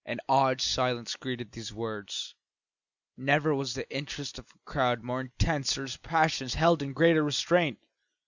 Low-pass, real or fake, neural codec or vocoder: 7.2 kHz; real; none